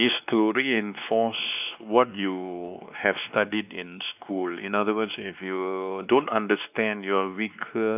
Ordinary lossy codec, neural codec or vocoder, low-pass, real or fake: none; codec, 16 kHz, 2 kbps, X-Codec, WavLM features, trained on Multilingual LibriSpeech; 3.6 kHz; fake